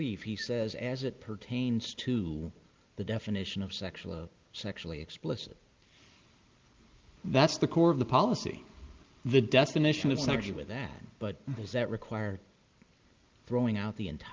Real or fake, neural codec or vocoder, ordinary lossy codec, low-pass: real; none; Opus, 32 kbps; 7.2 kHz